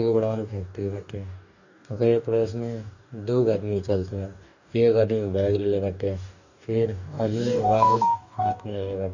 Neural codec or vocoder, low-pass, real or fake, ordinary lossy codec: codec, 44.1 kHz, 2.6 kbps, DAC; 7.2 kHz; fake; none